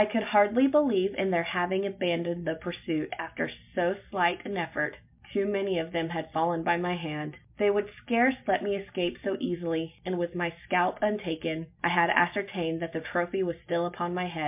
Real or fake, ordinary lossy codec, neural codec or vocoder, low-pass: real; MP3, 32 kbps; none; 3.6 kHz